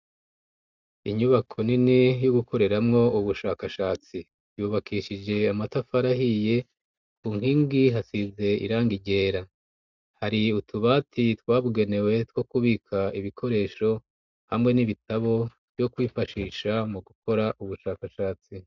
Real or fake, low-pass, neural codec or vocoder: real; 7.2 kHz; none